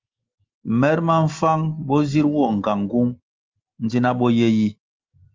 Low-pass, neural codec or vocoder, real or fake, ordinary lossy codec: 7.2 kHz; none; real; Opus, 32 kbps